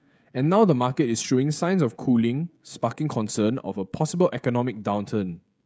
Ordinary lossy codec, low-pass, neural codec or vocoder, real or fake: none; none; codec, 16 kHz, 16 kbps, FreqCodec, smaller model; fake